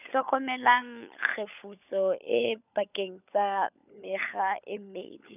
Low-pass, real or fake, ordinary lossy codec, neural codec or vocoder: 3.6 kHz; fake; none; codec, 16 kHz, 16 kbps, FunCodec, trained on LibriTTS, 50 frames a second